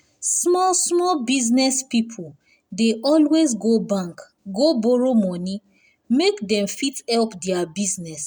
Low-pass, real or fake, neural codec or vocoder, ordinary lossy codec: none; real; none; none